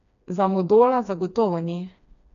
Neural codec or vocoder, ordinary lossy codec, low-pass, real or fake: codec, 16 kHz, 2 kbps, FreqCodec, smaller model; none; 7.2 kHz; fake